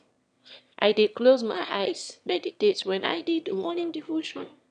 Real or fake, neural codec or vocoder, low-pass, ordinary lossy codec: fake; autoencoder, 22.05 kHz, a latent of 192 numbers a frame, VITS, trained on one speaker; 9.9 kHz; none